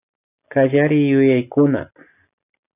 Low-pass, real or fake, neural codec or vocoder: 3.6 kHz; real; none